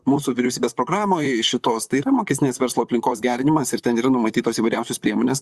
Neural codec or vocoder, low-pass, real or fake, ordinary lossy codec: vocoder, 44.1 kHz, 128 mel bands, Pupu-Vocoder; 14.4 kHz; fake; Opus, 64 kbps